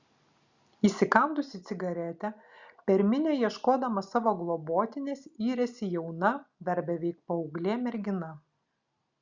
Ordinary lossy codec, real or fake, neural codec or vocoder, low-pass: Opus, 64 kbps; real; none; 7.2 kHz